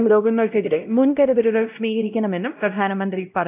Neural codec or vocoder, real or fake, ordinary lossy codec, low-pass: codec, 16 kHz, 0.5 kbps, X-Codec, WavLM features, trained on Multilingual LibriSpeech; fake; AAC, 32 kbps; 3.6 kHz